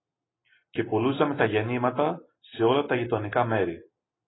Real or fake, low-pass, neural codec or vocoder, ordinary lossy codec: real; 7.2 kHz; none; AAC, 16 kbps